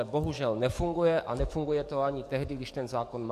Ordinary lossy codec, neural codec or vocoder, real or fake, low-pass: MP3, 64 kbps; codec, 44.1 kHz, 7.8 kbps, DAC; fake; 14.4 kHz